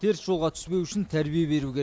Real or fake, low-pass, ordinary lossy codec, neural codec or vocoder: real; none; none; none